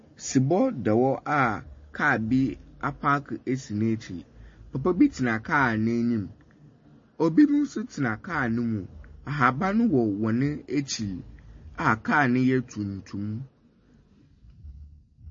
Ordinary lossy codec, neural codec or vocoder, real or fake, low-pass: MP3, 32 kbps; none; real; 7.2 kHz